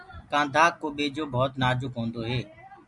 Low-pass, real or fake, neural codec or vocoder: 10.8 kHz; real; none